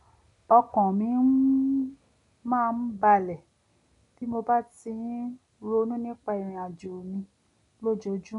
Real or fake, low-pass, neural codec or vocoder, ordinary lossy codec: real; 10.8 kHz; none; none